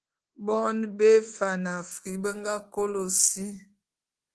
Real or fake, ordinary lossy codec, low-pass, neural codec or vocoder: fake; Opus, 16 kbps; 10.8 kHz; codec, 24 kHz, 1.2 kbps, DualCodec